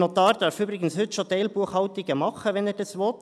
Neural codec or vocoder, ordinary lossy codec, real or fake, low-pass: none; none; real; none